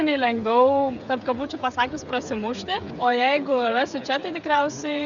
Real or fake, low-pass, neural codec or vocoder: fake; 7.2 kHz; codec, 16 kHz, 8 kbps, FreqCodec, smaller model